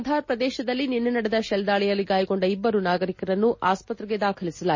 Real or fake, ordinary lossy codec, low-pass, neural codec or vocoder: real; MP3, 32 kbps; 7.2 kHz; none